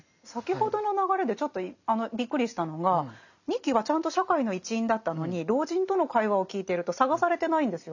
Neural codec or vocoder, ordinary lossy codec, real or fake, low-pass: none; none; real; 7.2 kHz